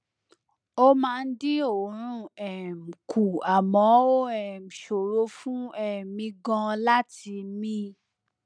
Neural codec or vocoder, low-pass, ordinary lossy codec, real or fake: none; 9.9 kHz; none; real